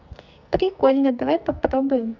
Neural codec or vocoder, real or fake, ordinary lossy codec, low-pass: codec, 44.1 kHz, 2.6 kbps, SNAC; fake; none; 7.2 kHz